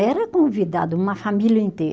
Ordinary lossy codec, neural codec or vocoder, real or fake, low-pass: none; none; real; none